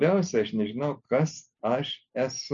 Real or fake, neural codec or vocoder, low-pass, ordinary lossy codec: real; none; 7.2 kHz; MP3, 64 kbps